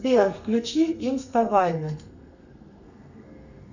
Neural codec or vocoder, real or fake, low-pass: codec, 32 kHz, 1.9 kbps, SNAC; fake; 7.2 kHz